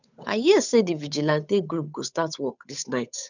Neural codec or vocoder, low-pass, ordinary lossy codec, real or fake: codec, 16 kHz, 8 kbps, FunCodec, trained on Chinese and English, 25 frames a second; 7.2 kHz; none; fake